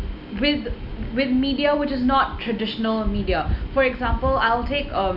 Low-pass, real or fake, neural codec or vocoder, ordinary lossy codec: 5.4 kHz; real; none; none